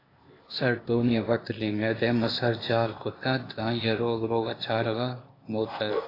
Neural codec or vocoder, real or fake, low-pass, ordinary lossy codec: codec, 16 kHz, 0.8 kbps, ZipCodec; fake; 5.4 kHz; AAC, 24 kbps